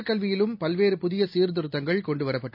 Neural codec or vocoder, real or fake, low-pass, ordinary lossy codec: none; real; 5.4 kHz; none